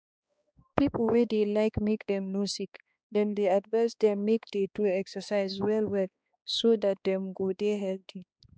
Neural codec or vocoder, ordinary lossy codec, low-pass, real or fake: codec, 16 kHz, 2 kbps, X-Codec, HuBERT features, trained on balanced general audio; none; none; fake